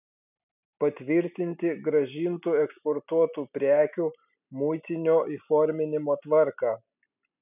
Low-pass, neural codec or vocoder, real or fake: 3.6 kHz; none; real